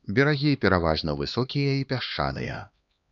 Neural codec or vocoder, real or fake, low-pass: codec, 16 kHz, 2 kbps, X-Codec, HuBERT features, trained on LibriSpeech; fake; 7.2 kHz